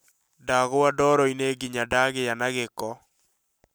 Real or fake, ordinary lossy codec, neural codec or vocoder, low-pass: real; none; none; none